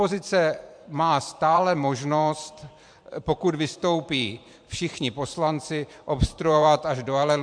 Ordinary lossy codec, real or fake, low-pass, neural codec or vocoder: MP3, 64 kbps; fake; 9.9 kHz; vocoder, 44.1 kHz, 128 mel bands every 512 samples, BigVGAN v2